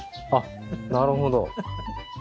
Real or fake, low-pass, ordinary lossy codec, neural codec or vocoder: real; none; none; none